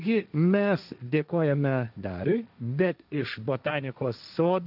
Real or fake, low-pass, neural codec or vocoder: fake; 5.4 kHz; codec, 16 kHz, 1.1 kbps, Voila-Tokenizer